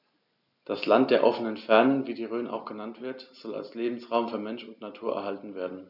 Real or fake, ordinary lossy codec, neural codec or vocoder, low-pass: real; none; none; 5.4 kHz